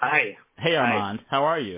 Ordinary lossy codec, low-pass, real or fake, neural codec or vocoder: MP3, 16 kbps; 3.6 kHz; real; none